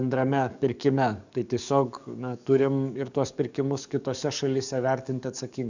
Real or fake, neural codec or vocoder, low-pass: fake; codec, 44.1 kHz, 7.8 kbps, DAC; 7.2 kHz